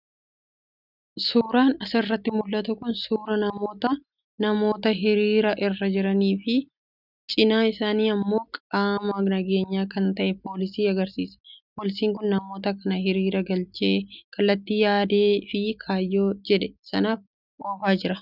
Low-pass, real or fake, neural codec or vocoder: 5.4 kHz; real; none